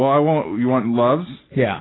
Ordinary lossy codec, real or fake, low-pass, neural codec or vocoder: AAC, 16 kbps; real; 7.2 kHz; none